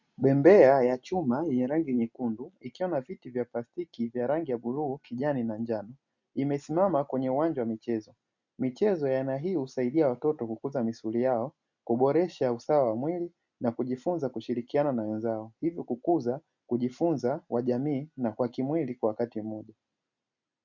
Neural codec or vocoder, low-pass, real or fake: none; 7.2 kHz; real